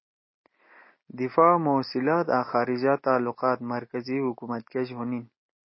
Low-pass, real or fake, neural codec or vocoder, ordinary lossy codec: 7.2 kHz; real; none; MP3, 24 kbps